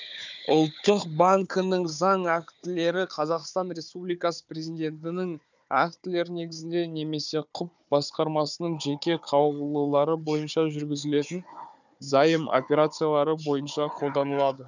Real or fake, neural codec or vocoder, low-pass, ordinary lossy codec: fake; codec, 16 kHz, 4 kbps, FunCodec, trained on Chinese and English, 50 frames a second; 7.2 kHz; none